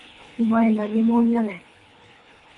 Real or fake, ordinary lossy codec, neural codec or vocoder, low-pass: fake; MP3, 96 kbps; codec, 24 kHz, 3 kbps, HILCodec; 10.8 kHz